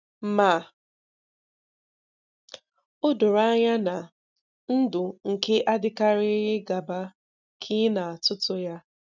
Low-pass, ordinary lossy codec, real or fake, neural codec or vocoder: 7.2 kHz; none; real; none